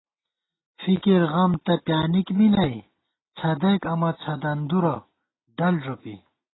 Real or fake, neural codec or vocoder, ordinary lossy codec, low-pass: real; none; AAC, 16 kbps; 7.2 kHz